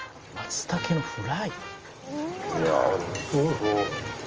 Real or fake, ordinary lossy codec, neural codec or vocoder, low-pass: real; Opus, 24 kbps; none; 7.2 kHz